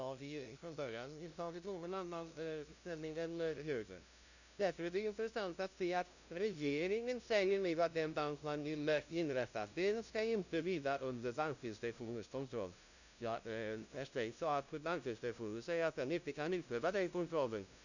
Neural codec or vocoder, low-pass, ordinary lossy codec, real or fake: codec, 16 kHz, 0.5 kbps, FunCodec, trained on LibriTTS, 25 frames a second; 7.2 kHz; none; fake